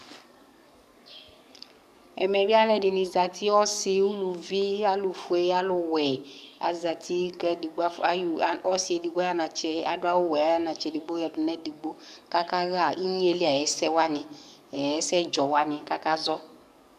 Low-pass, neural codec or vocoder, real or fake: 14.4 kHz; codec, 44.1 kHz, 7.8 kbps, DAC; fake